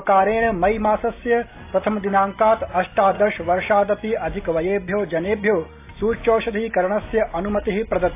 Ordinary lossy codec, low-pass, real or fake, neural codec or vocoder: AAC, 24 kbps; 3.6 kHz; real; none